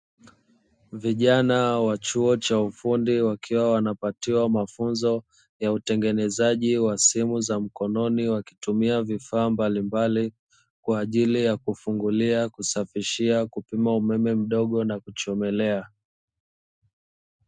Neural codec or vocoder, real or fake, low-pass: none; real; 9.9 kHz